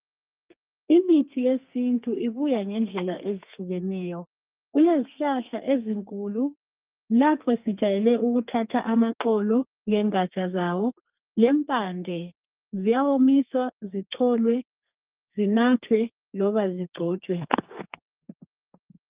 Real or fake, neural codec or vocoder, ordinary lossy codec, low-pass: fake; codec, 32 kHz, 1.9 kbps, SNAC; Opus, 24 kbps; 3.6 kHz